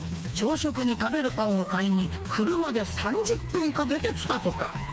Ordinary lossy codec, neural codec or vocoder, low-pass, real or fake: none; codec, 16 kHz, 2 kbps, FreqCodec, smaller model; none; fake